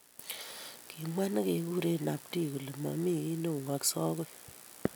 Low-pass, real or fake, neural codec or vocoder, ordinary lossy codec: none; real; none; none